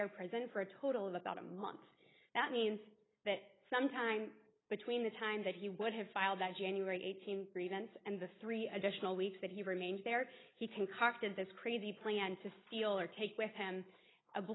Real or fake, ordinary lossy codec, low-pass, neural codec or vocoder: real; AAC, 16 kbps; 7.2 kHz; none